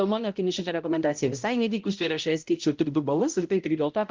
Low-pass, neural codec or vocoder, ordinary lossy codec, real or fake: 7.2 kHz; codec, 16 kHz, 0.5 kbps, X-Codec, HuBERT features, trained on balanced general audio; Opus, 32 kbps; fake